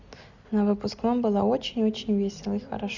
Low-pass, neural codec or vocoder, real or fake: 7.2 kHz; none; real